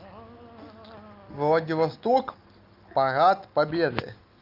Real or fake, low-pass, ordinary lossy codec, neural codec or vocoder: real; 5.4 kHz; Opus, 32 kbps; none